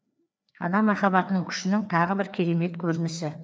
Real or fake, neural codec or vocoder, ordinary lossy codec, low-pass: fake; codec, 16 kHz, 2 kbps, FreqCodec, larger model; none; none